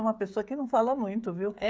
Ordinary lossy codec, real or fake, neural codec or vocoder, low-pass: none; fake; codec, 16 kHz, 16 kbps, FreqCodec, smaller model; none